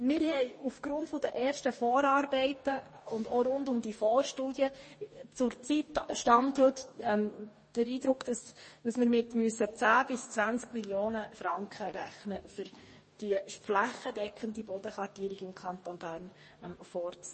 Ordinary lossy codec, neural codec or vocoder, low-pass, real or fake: MP3, 32 kbps; codec, 44.1 kHz, 2.6 kbps, DAC; 10.8 kHz; fake